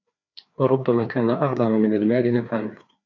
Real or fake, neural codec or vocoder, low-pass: fake; codec, 16 kHz, 2 kbps, FreqCodec, larger model; 7.2 kHz